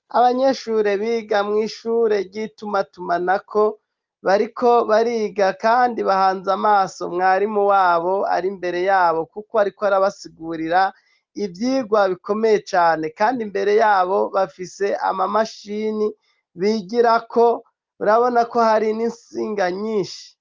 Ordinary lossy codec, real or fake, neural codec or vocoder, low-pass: Opus, 24 kbps; real; none; 7.2 kHz